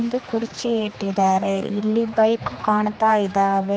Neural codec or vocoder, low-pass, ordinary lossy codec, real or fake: codec, 16 kHz, 2 kbps, X-Codec, HuBERT features, trained on general audio; none; none; fake